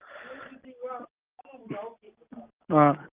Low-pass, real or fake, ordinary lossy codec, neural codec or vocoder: 3.6 kHz; real; Opus, 24 kbps; none